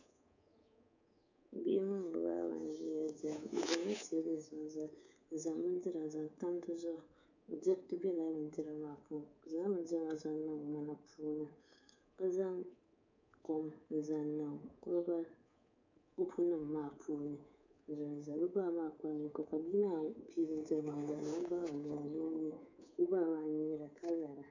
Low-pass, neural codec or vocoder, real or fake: 7.2 kHz; codec, 24 kHz, 3.1 kbps, DualCodec; fake